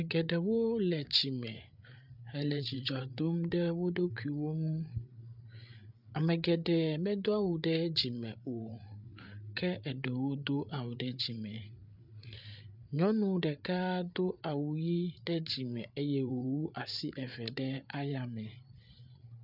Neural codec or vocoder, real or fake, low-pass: codec, 16 kHz, 8 kbps, FreqCodec, larger model; fake; 5.4 kHz